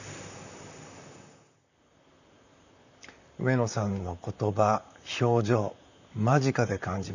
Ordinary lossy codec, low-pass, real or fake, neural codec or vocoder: none; 7.2 kHz; fake; vocoder, 44.1 kHz, 128 mel bands, Pupu-Vocoder